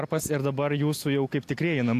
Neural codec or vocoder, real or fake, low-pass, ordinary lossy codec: none; real; 14.4 kHz; AAC, 64 kbps